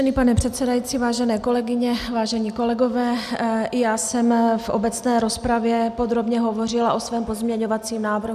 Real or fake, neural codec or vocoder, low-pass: real; none; 14.4 kHz